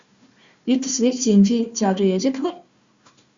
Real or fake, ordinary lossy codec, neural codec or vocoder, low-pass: fake; Opus, 64 kbps; codec, 16 kHz, 1 kbps, FunCodec, trained on Chinese and English, 50 frames a second; 7.2 kHz